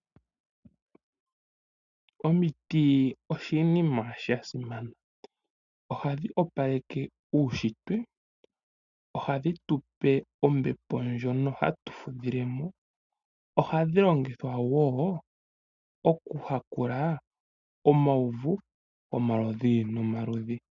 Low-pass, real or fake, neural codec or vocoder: 7.2 kHz; real; none